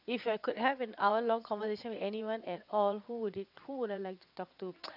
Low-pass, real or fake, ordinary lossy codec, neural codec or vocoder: 5.4 kHz; fake; none; vocoder, 22.05 kHz, 80 mel bands, WaveNeXt